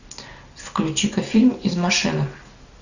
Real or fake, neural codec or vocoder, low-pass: real; none; 7.2 kHz